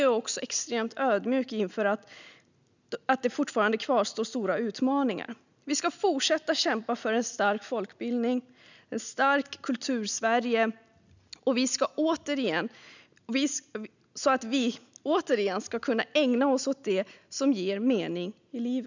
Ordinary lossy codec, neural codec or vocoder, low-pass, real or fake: none; none; 7.2 kHz; real